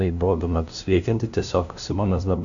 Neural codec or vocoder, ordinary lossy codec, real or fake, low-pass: codec, 16 kHz, 0.5 kbps, FunCodec, trained on LibriTTS, 25 frames a second; MP3, 64 kbps; fake; 7.2 kHz